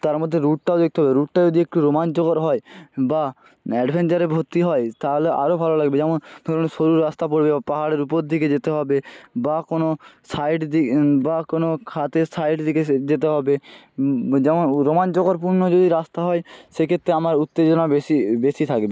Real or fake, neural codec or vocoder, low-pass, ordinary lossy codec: real; none; none; none